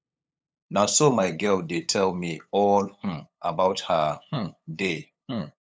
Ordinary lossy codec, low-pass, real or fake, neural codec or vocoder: none; none; fake; codec, 16 kHz, 8 kbps, FunCodec, trained on LibriTTS, 25 frames a second